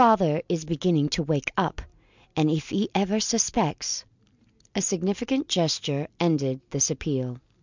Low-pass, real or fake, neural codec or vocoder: 7.2 kHz; real; none